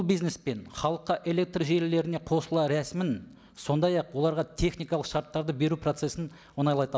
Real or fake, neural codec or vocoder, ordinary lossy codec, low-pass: real; none; none; none